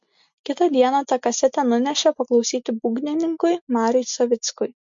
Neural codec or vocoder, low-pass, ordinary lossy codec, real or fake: none; 7.2 kHz; MP3, 48 kbps; real